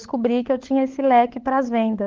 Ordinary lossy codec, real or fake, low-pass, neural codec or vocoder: Opus, 16 kbps; fake; 7.2 kHz; codec, 16 kHz, 16 kbps, FunCodec, trained on LibriTTS, 50 frames a second